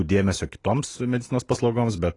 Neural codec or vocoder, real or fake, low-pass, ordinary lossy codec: none; real; 10.8 kHz; AAC, 32 kbps